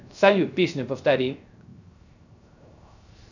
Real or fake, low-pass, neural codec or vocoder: fake; 7.2 kHz; codec, 16 kHz, 0.3 kbps, FocalCodec